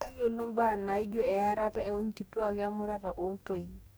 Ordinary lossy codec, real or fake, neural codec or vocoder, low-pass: none; fake; codec, 44.1 kHz, 2.6 kbps, DAC; none